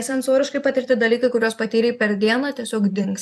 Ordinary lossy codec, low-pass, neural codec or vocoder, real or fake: AAC, 96 kbps; 14.4 kHz; vocoder, 44.1 kHz, 128 mel bands every 256 samples, BigVGAN v2; fake